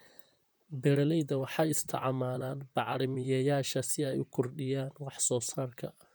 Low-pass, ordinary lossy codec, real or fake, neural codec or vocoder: none; none; fake; vocoder, 44.1 kHz, 128 mel bands, Pupu-Vocoder